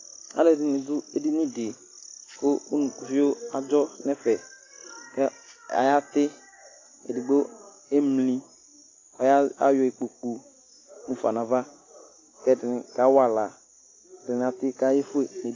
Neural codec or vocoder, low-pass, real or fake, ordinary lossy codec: none; 7.2 kHz; real; AAC, 32 kbps